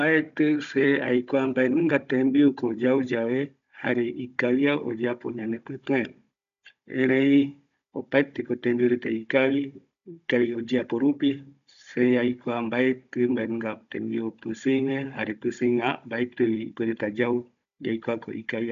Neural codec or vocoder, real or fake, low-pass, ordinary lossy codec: codec, 16 kHz, 16 kbps, FunCodec, trained on Chinese and English, 50 frames a second; fake; 7.2 kHz; none